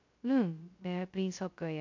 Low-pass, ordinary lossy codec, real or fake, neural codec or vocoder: 7.2 kHz; MP3, 48 kbps; fake; codec, 16 kHz, 0.2 kbps, FocalCodec